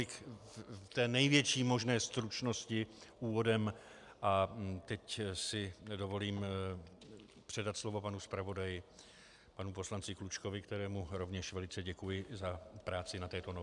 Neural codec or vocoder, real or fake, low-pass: none; real; 10.8 kHz